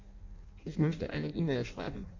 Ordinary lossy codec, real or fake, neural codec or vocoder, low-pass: MP3, 48 kbps; fake; codec, 16 kHz in and 24 kHz out, 0.6 kbps, FireRedTTS-2 codec; 7.2 kHz